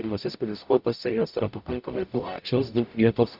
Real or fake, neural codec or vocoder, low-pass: fake; codec, 44.1 kHz, 0.9 kbps, DAC; 5.4 kHz